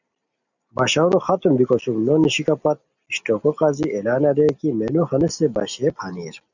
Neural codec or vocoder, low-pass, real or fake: none; 7.2 kHz; real